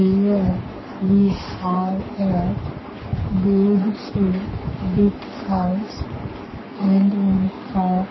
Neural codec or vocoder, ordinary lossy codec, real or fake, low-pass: codec, 44.1 kHz, 3.4 kbps, Pupu-Codec; MP3, 24 kbps; fake; 7.2 kHz